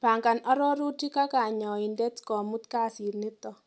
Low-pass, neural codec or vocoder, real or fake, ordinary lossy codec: none; none; real; none